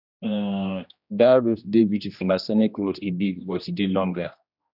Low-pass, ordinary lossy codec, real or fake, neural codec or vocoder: 5.4 kHz; none; fake; codec, 16 kHz, 1 kbps, X-Codec, HuBERT features, trained on general audio